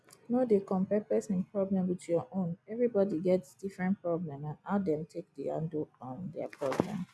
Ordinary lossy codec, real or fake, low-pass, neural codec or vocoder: none; real; none; none